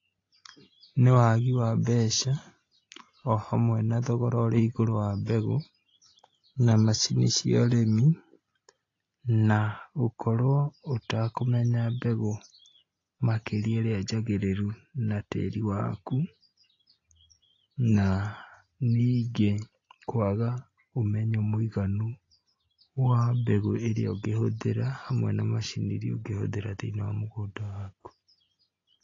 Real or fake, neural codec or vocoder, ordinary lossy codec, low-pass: real; none; AAC, 32 kbps; 7.2 kHz